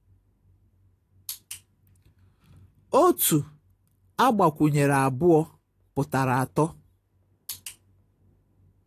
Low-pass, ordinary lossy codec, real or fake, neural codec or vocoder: 14.4 kHz; AAC, 48 kbps; real; none